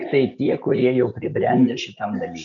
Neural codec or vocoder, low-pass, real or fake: none; 7.2 kHz; real